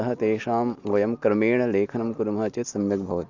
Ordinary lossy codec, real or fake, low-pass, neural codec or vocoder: none; real; 7.2 kHz; none